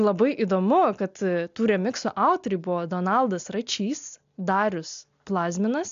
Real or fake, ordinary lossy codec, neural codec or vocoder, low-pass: real; AAC, 64 kbps; none; 7.2 kHz